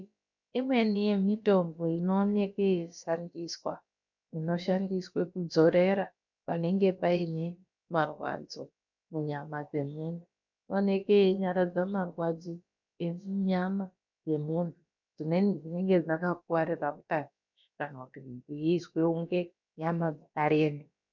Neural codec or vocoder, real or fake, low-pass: codec, 16 kHz, about 1 kbps, DyCAST, with the encoder's durations; fake; 7.2 kHz